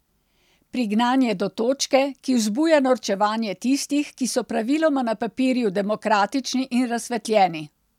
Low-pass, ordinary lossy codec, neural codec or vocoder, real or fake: 19.8 kHz; none; none; real